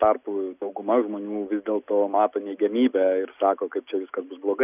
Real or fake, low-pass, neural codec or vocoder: real; 3.6 kHz; none